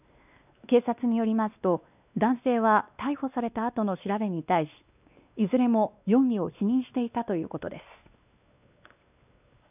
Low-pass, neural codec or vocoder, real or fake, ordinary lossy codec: 3.6 kHz; codec, 16 kHz, 2 kbps, X-Codec, WavLM features, trained on Multilingual LibriSpeech; fake; none